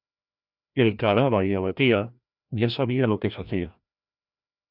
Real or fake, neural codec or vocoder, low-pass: fake; codec, 16 kHz, 1 kbps, FreqCodec, larger model; 5.4 kHz